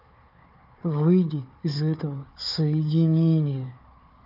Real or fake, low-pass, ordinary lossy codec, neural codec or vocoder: fake; 5.4 kHz; none; codec, 16 kHz, 4 kbps, FunCodec, trained on Chinese and English, 50 frames a second